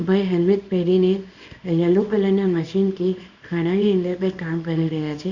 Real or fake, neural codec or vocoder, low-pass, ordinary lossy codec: fake; codec, 24 kHz, 0.9 kbps, WavTokenizer, small release; 7.2 kHz; Opus, 64 kbps